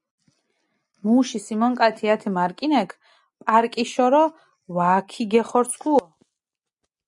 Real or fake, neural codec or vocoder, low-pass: real; none; 10.8 kHz